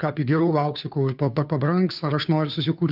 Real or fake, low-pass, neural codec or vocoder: fake; 5.4 kHz; codec, 16 kHz, 2 kbps, FunCodec, trained on Chinese and English, 25 frames a second